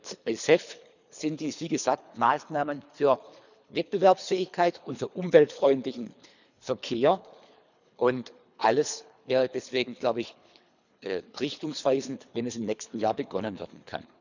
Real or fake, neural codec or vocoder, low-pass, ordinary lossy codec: fake; codec, 24 kHz, 3 kbps, HILCodec; 7.2 kHz; none